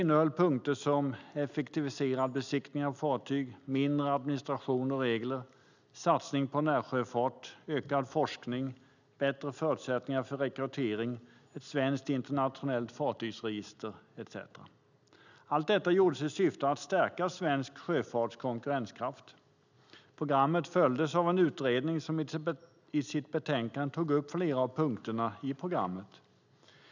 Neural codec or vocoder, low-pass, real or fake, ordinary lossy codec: none; 7.2 kHz; real; none